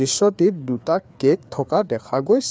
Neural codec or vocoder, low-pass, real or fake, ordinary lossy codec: codec, 16 kHz, 4 kbps, FreqCodec, larger model; none; fake; none